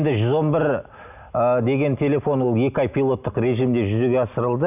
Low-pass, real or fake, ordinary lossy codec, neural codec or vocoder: 3.6 kHz; real; none; none